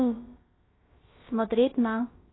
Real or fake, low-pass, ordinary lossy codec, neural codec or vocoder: fake; 7.2 kHz; AAC, 16 kbps; codec, 16 kHz, about 1 kbps, DyCAST, with the encoder's durations